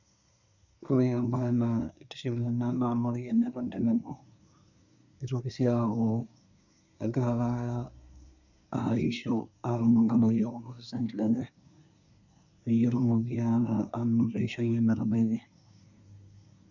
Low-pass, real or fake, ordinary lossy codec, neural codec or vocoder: 7.2 kHz; fake; none; codec, 24 kHz, 1 kbps, SNAC